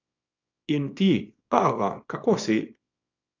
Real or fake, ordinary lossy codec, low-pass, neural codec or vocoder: fake; AAC, 48 kbps; 7.2 kHz; codec, 24 kHz, 0.9 kbps, WavTokenizer, small release